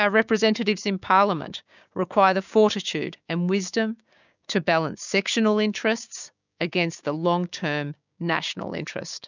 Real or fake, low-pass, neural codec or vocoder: fake; 7.2 kHz; codec, 16 kHz, 6 kbps, DAC